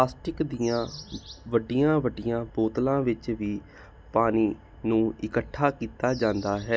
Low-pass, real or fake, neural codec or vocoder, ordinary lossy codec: none; real; none; none